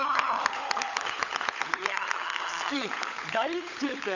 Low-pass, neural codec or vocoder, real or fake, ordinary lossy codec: 7.2 kHz; codec, 16 kHz, 16 kbps, FunCodec, trained on LibriTTS, 50 frames a second; fake; none